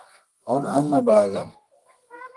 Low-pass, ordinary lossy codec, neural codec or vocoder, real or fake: 10.8 kHz; Opus, 24 kbps; codec, 24 kHz, 0.9 kbps, WavTokenizer, medium music audio release; fake